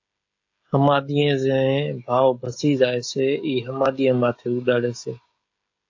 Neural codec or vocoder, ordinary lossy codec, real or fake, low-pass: codec, 16 kHz, 16 kbps, FreqCodec, smaller model; AAC, 48 kbps; fake; 7.2 kHz